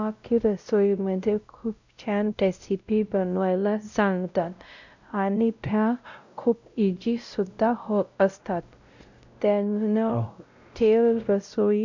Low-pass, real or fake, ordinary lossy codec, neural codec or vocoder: 7.2 kHz; fake; none; codec, 16 kHz, 0.5 kbps, X-Codec, WavLM features, trained on Multilingual LibriSpeech